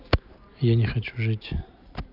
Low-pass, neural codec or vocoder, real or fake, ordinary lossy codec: 5.4 kHz; none; real; none